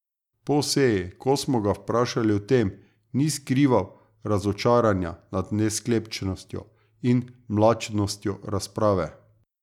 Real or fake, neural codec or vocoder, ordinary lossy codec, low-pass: real; none; none; 19.8 kHz